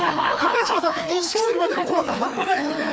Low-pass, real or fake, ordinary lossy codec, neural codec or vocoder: none; fake; none; codec, 16 kHz, 2 kbps, FreqCodec, larger model